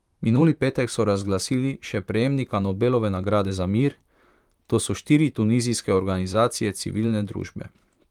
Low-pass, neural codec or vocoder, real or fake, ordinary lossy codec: 19.8 kHz; vocoder, 44.1 kHz, 128 mel bands, Pupu-Vocoder; fake; Opus, 32 kbps